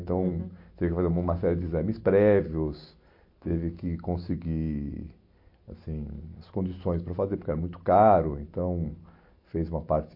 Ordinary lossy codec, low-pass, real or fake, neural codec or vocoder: MP3, 32 kbps; 5.4 kHz; real; none